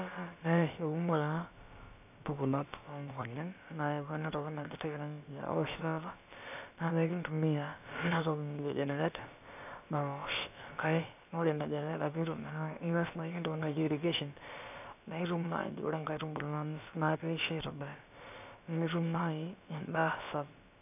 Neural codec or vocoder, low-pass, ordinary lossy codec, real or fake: codec, 16 kHz, about 1 kbps, DyCAST, with the encoder's durations; 3.6 kHz; none; fake